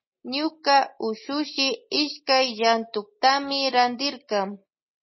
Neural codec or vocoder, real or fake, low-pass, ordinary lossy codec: none; real; 7.2 kHz; MP3, 24 kbps